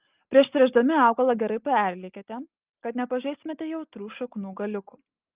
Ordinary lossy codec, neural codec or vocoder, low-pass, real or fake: Opus, 32 kbps; none; 3.6 kHz; real